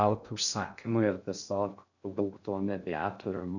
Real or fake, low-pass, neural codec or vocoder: fake; 7.2 kHz; codec, 16 kHz in and 24 kHz out, 0.6 kbps, FocalCodec, streaming, 2048 codes